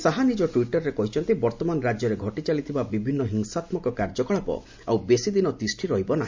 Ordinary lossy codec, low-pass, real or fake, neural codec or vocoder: MP3, 64 kbps; 7.2 kHz; real; none